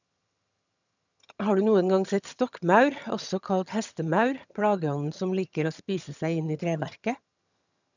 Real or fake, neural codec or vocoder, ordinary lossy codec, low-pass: fake; vocoder, 22.05 kHz, 80 mel bands, HiFi-GAN; none; 7.2 kHz